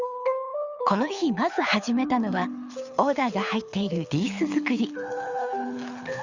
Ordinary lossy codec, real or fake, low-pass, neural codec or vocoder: Opus, 64 kbps; fake; 7.2 kHz; codec, 24 kHz, 6 kbps, HILCodec